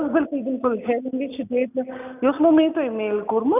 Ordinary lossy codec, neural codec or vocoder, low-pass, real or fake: none; none; 3.6 kHz; real